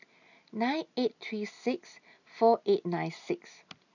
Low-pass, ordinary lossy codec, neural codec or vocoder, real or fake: 7.2 kHz; none; none; real